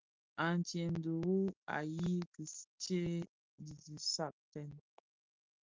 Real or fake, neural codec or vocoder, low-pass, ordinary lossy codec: real; none; 7.2 kHz; Opus, 32 kbps